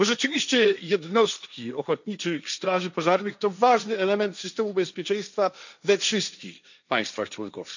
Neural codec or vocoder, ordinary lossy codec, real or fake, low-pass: codec, 16 kHz, 1.1 kbps, Voila-Tokenizer; none; fake; 7.2 kHz